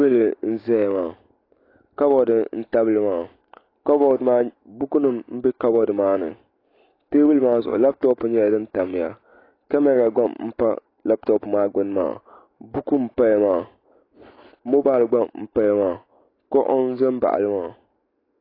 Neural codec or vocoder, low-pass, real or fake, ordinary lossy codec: none; 5.4 kHz; real; AAC, 24 kbps